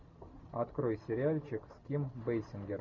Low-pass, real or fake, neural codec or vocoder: 7.2 kHz; real; none